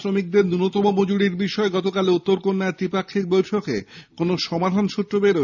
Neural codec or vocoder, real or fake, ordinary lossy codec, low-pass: none; real; none; 7.2 kHz